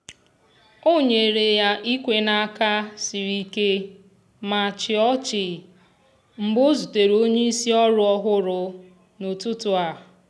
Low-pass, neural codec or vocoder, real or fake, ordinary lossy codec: none; none; real; none